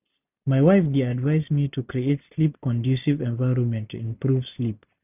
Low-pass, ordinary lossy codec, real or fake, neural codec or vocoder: 3.6 kHz; none; real; none